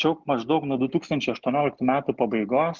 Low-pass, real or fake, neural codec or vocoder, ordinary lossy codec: 7.2 kHz; real; none; Opus, 24 kbps